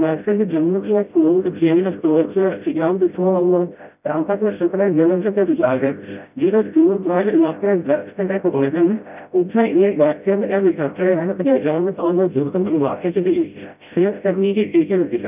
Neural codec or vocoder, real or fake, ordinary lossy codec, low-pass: codec, 16 kHz, 0.5 kbps, FreqCodec, smaller model; fake; none; 3.6 kHz